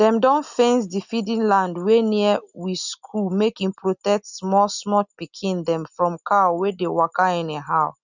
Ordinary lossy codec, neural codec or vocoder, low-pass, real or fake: none; none; 7.2 kHz; real